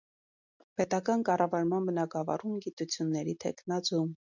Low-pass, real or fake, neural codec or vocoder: 7.2 kHz; real; none